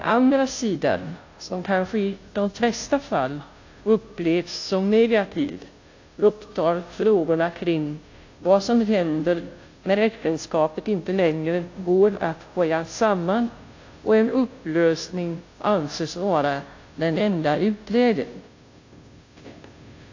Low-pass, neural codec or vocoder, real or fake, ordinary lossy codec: 7.2 kHz; codec, 16 kHz, 0.5 kbps, FunCodec, trained on Chinese and English, 25 frames a second; fake; AAC, 48 kbps